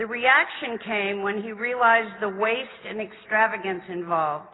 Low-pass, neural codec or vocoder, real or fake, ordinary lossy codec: 7.2 kHz; none; real; AAC, 16 kbps